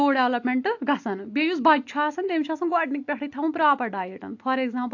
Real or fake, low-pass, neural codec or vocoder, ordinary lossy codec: real; 7.2 kHz; none; AAC, 48 kbps